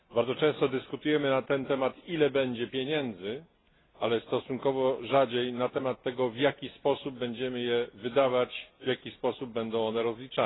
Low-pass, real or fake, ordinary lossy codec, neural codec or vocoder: 7.2 kHz; real; AAC, 16 kbps; none